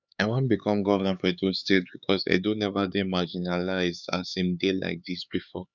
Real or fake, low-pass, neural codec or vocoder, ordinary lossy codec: fake; 7.2 kHz; codec, 16 kHz, 4 kbps, X-Codec, HuBERT features, trained on LibriSpeech; none